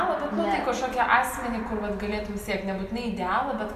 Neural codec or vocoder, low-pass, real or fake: none; 14.4 kHz; real